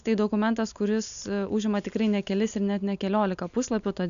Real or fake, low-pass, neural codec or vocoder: real; 7.2 kHz; none